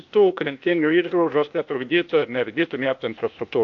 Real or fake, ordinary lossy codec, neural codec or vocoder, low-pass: fake; AAC, 48 kbps; codec, 16 kHz, 0.8 kbps, ZipCodec; 7.2 kHz